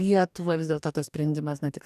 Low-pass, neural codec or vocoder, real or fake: 14.4 kHz; codec, 44.1 kHz, 2.6 kbps, DAC; fake